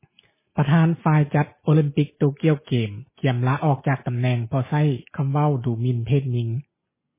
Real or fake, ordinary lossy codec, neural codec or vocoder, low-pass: real; MP3, 16 kbps; none; 3.6 kHz